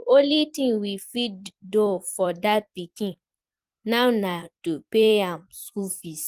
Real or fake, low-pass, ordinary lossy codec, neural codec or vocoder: fake; 14.4 kHz; Opus, 24 kbps; vocoder, 44.1 kHz, 128 mel bands every 256 samples, BigVGAN v2